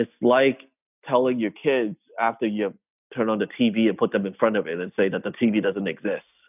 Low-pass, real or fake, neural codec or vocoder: 3.6 kHz; real; none